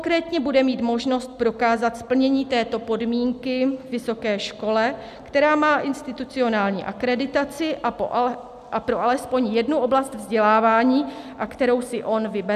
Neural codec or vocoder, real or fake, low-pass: none; real; 14.4 kHz